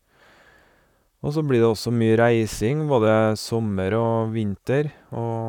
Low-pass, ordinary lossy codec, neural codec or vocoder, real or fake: 19.8 kHz; none; vocoder, 44.1 kHz, 128 mel bands, Pupu-Vocoder; fake